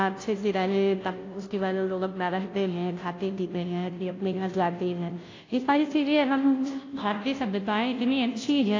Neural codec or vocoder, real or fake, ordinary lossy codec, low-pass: codec, 16 kHz, 0.5 kbps, FunCodec, trained on Chinese and English, 25 frames a second; fake; AAC, 32 kbps; 7.2 kHz